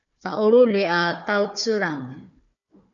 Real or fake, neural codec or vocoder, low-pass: fake; codec, 16 kHz, 4 kbps, FunCodec, trained on Chinese and English, 50 frames a second; 7.2 kHz